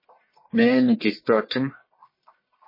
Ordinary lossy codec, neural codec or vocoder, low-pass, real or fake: MP3, 24 kbps; codec, 44.1 kHz, 1.7 kbps, Pupu-Codec; 5.4 kHz; fake